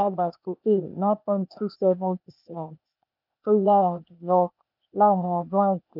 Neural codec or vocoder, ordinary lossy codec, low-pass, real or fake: codec, 16 kHz, 0.8 kbps, ZipCodec; none; 5.4 kHz; fake